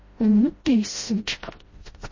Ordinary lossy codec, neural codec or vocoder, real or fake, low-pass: MP3, 32 kbps; codec, 16 kHz, 0.5 kbps, FreqCodec, smaller model; fake; 7.2 kHz